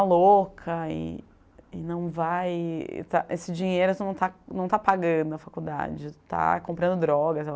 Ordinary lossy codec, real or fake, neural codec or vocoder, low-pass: none; real; none; none